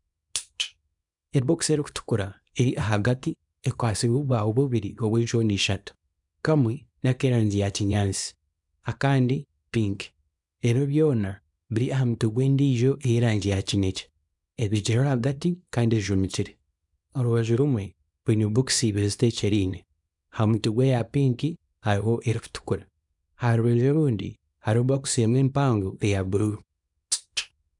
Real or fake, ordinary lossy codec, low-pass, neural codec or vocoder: fake; none; 10.8 kHz; codec, 24 kHz, 0.9 kbps, WavTokenizer, small release